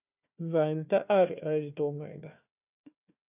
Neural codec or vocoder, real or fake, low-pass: codec, 16 kHz, 1 kbps, FunCodec, trained on Chinese and English, 50 frames a second; fake; 3.6 kHz